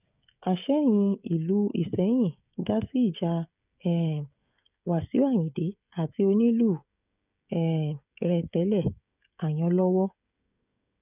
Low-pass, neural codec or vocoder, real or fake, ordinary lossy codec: 3.6 kHz; codec, 16 kHz, 16 kbps, FreqCodec, smaller model; fake; none